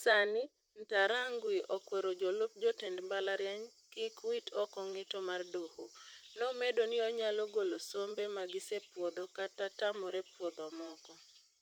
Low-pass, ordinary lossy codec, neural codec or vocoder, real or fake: 19.8 kHz; none; vocoder, 44.1 kHz, 128 mel bands, Pupu-Vocoder; fake